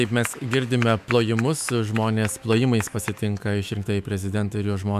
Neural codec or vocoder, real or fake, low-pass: autoencoder, 48 kHz, 128 numbers a frame, DAC-VAE, trained on Japanese speech; fake; 14.4 kHz